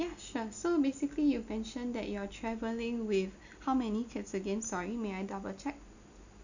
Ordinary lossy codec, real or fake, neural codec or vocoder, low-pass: none; real; none; 7.2 kHz